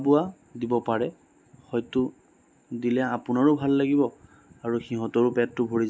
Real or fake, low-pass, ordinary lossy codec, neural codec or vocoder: real; none; none; none